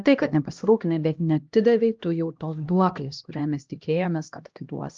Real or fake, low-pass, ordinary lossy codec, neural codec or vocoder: fake; 7.2 kHz; Opus, 24 kbps; codec, 16 kHz, 1 kbps, X-Codec, HuBERT features, trained on LibriSpeech